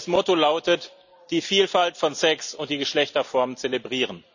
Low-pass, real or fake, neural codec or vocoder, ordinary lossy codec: 7.2 kHz; real; none; none